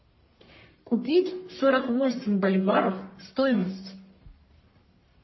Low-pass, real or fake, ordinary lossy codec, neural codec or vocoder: 7.2 kHz; fake; MP3, 24 kbps; codec, 44.1 kHz, 1.7 kbps, Pupu-Codec